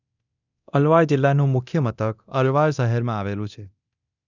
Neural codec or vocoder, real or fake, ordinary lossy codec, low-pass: codec, 24 kHz, 0.9 kbps, DualCodec; fake; none; 7.2 kHz